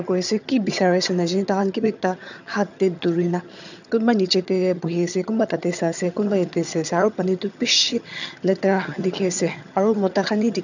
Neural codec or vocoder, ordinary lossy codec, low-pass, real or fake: vocoder, 22.05 kHz, 80 mel bands, HiFi-GAN; none; 7.2 kHz; fake